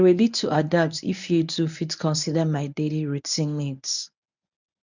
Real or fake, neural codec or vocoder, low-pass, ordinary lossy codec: fake; codec, 24 kHz, 0.9 kbps, WavTokenizer, medium speech release version 1; 7.2 kHz; none